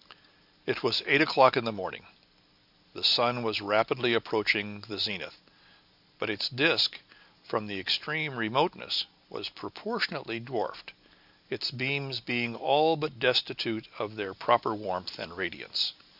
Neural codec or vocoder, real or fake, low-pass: none; real; 5.4 kHz